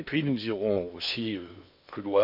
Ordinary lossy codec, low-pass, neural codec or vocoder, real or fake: none; 5.4 kHz; codec, 16 kHz in and 24 kHz out, 0.8 kbps, FocalCodec, streaming, 65536 codes; fake